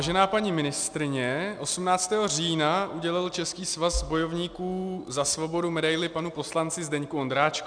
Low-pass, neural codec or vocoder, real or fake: 9.9 kHz; none; real